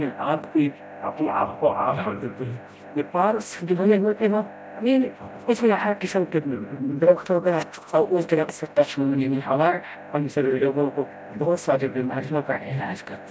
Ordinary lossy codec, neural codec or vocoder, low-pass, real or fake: none; codec, 16 kHz, 0.5 kbps, FreqCodec, smaller model; none; fake